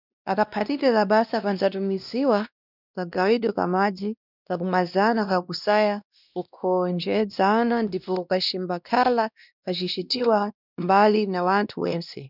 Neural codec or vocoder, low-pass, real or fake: codec, 16 kHz, 1 kbps, X-Codec, WavLM features, trained on Multilingual LibriSpeech; 5.4 kHz; fake